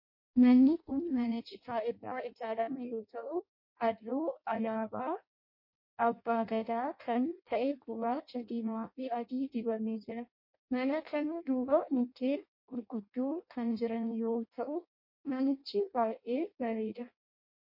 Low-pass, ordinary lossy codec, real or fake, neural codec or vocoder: 5.4 kHz; MP3, 32 kbps; fake; codec, 16 kHz in and 24 kHz out, 0.6 kbps, FireRedTTS-2 codec